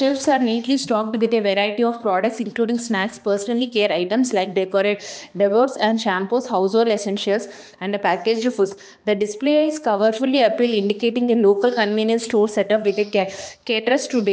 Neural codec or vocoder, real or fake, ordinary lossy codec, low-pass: codec, 16 kHz, 2 kbps, X-Codec, HuBERT features, trained on balanced general audio; fake; none; none